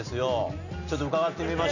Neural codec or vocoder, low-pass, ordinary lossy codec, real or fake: none; 7.2 kHz; none; real